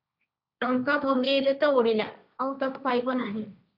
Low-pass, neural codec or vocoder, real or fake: 5.4 kHz; codec, 16 kHz, 1.1 kbps, Voila-Tokenizer; fake